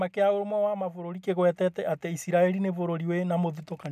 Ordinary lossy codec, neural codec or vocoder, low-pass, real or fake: none; none; 14.4 kHz; real